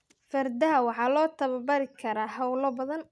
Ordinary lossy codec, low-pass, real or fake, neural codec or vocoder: none; none; real; none